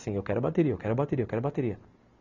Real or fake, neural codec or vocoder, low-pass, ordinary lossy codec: real; none; 7.2 kHz; none